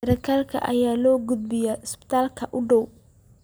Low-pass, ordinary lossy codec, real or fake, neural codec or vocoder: none; none; real; none